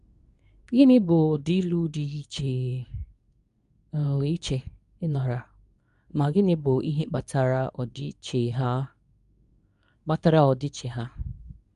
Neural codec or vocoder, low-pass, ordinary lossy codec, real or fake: codec, 24 kHz, 0.9 kbps, WavTokenizer, medium speech release version 2; 10.8 kHz; none; fake